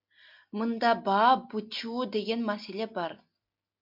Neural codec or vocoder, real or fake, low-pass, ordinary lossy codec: none; real; 5.4 kHz; MP3, 48 kbps